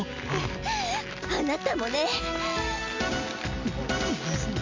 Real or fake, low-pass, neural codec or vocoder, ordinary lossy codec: real; 7.2 kHz; none; MP3, 64 kbps